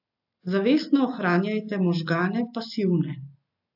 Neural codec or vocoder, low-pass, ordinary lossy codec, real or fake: none; 5.4 kHz; AAC, 48 kbps; real